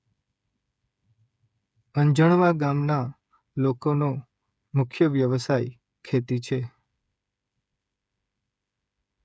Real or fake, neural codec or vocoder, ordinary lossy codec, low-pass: fake; codec, 16 kHz, 8 kbps, FreqCodec, smaller model; none; none